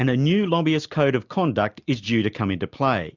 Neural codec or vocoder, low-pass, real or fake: none; 7.2 kHz; real